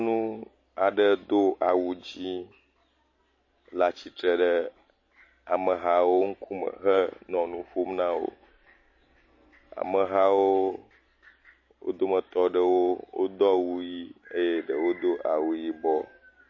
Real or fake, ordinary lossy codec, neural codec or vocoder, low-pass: real; MP3, 32 kbps; none; 7.2 kHz